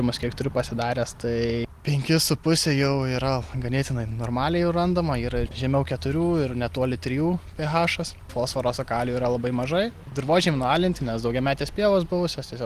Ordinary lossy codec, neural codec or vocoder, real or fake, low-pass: Opus, 24 kbps; none; real; 14.4 kHz